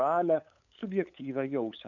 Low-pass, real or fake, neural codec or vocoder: 7.2 kHz; fake; codec, 16 kHz, 8 kbps, FunCodec, trained on LibriTTS, 25 frames a second